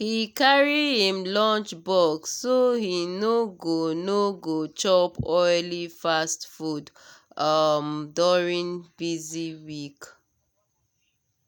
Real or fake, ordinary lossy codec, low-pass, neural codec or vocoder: real; none; 19.8 kHz; none